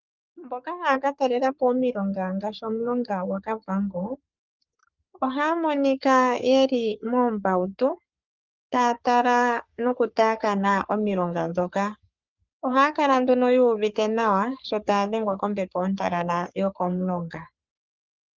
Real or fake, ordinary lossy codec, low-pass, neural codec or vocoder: fake; Opus, 24 kbps; 7.2 kHz; codec, 44.1 kHz, 3.4 kbps, Pupu-Codec